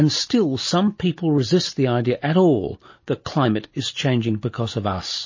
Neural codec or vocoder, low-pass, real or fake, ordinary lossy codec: none; 7.2 kHz; real; MP3, 32 kbps